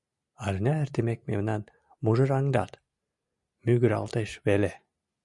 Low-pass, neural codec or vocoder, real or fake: 10.8 kHz; none; real